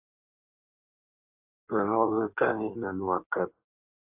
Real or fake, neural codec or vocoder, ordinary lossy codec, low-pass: fake; codec, 16 kHz in and 24 kHz out, 1.1 kbps, FireRedTTS-2 codec; AAC, 32 kbps; 3.6 kHz